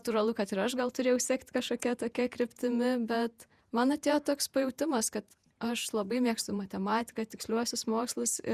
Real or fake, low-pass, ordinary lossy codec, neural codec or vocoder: fake; 14.4 kHz; Opus, 64 kbps; vocoder, 48 kHz, 128 mel bands, Vocos